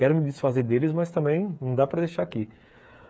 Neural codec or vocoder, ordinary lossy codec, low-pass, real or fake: codec, 16 kHz, 8 kbps, FreqCodec, smaller model; none; none; fake